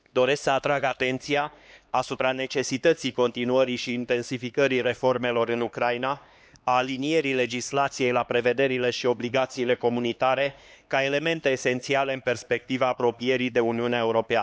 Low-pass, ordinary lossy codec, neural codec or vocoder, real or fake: none; none; codec, 16 kHz, 2 kbps, X-Codec, HuBERT features, trained on LibriSpeech; fake